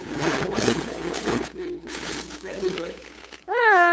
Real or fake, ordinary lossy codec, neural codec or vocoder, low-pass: fake; none; codec, 16 kHz, 8 kbps, FunCodec, trained on LibriTTS, 25 frames a second; none